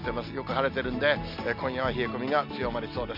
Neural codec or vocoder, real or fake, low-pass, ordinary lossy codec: none; real; 5.4 kHz; MP3, 48 kbps